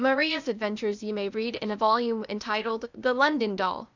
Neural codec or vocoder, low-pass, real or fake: codec, 16 kHz, 0.8 kbps, ZipCodec; 7.2 kHz; fake